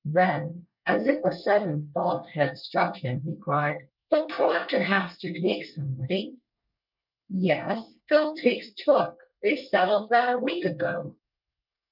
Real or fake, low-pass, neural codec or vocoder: fake; 5.4 kHz; codec, 24 kHz, 1 kbps, SNAC